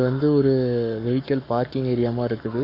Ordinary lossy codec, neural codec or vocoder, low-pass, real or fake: none; codec, 44.1 kHz, 7.8 kbps, Pupu-Codec; 5.4 kHz; fake